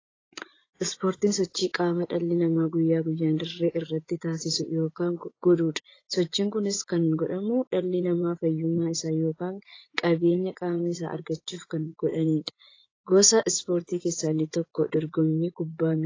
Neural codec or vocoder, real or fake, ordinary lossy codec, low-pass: vocoder, 24 kHz, 100 mel bands, Vocos; fake; AAC, 32 kbps; 7.2 kHz